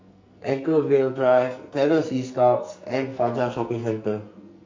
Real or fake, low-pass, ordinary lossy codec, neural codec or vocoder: fake; 7.2 kHz; MP3, 48 kbps; codec, 44.1 kHz, 2.6 kbps, SNAC